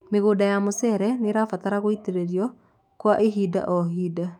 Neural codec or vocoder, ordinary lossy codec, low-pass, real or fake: autoencoder, 48 kHz, 128 numbers a frame, DAC-VAE, trained on Japanese speech; none; 19.8 kHz; fake